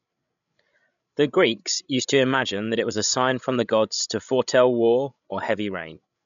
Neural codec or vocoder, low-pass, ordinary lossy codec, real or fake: codec, 16 kHz, 16 kbps, FreqCodec, larger model; 7.2 kHz; none; fake